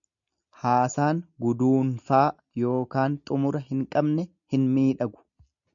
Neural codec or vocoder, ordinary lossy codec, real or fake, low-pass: none; MP3, 64 kbps; real; 7.2 kHz